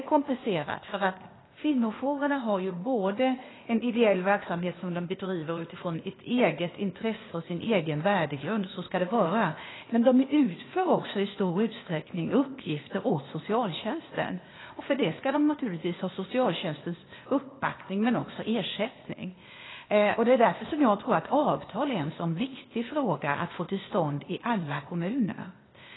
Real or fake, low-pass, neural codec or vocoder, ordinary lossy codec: fake; 7.2 kHz; codec, 16 kHz, 0.8 kbps, ZipCodec; AAC, 16 kbps